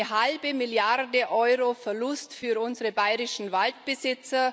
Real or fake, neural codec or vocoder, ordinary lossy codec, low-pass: real; none; none; none